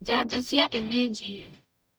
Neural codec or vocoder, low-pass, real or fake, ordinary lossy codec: codec, 44.1 kHz, 0.9 kbps, DAC; none; fake; none